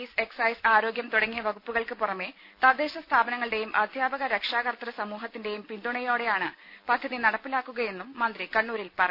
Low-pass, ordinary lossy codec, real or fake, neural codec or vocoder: 5.4 kHz; none; real; none